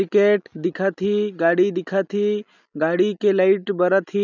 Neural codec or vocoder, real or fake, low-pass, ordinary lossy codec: none; real; 7.2 kHz; none